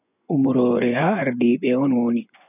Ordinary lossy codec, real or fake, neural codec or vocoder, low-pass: none; fake; vocoder, 24 kHz, 100 mel bands, Vocos; 3.6 kHz